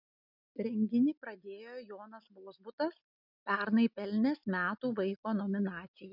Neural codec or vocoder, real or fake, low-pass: none; real; 5.4 kHz